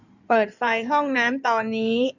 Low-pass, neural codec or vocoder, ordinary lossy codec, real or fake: 7.2 kHz; codec, 16 kHz in and 24 kHz out, 2.2 kbps, FireRedTTS-2 codec; none; fake